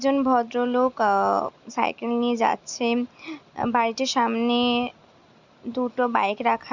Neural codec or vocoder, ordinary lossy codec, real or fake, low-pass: none; Opus, 64 kbps; real; 7.2 kHz